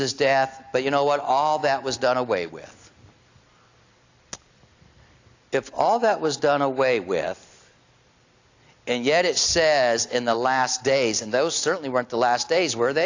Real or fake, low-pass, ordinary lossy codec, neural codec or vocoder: real; 7.2 kHz; AAC, 48 kbps; none